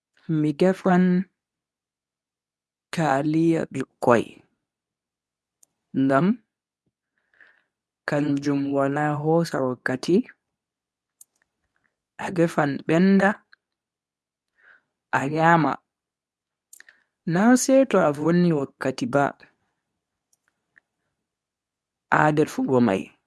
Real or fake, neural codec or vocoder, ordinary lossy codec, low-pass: fake; codec, 24 kHz, 0.9 kbps, WavTokenizer, medium speech release version 2; none; none